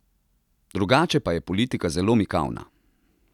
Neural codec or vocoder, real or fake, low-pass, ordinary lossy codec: none; real; 19.8 kHz; none